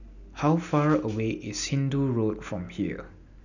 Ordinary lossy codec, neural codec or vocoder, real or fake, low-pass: none; none; real; 7.2 kHz